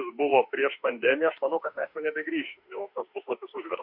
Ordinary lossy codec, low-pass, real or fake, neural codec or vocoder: MP3, 48 kbps; 5.4 kHz; fake; autoencoder, 48 kHz, 32 numbers a frame, DAC-VAE, trained on Japanese speech